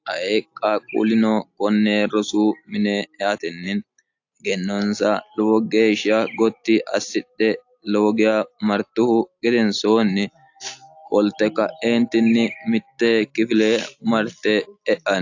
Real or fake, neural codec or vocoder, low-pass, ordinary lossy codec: real; none; 7.2 kHz; AAC, 48 kbps